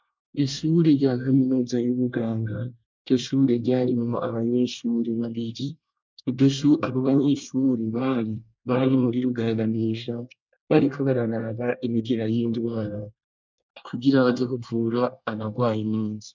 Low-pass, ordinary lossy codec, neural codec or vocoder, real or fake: 7.2 kHz; MP3, 64 kbps; codec, 24 kHz, 1 kbps, SNAC; fake